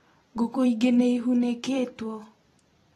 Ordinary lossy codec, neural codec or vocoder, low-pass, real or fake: AAC, 32 kbps; vocoder, 44.1 kHz, 128 mel bands every 256 samples, BigVGAN v2; 19.8 kHz; fake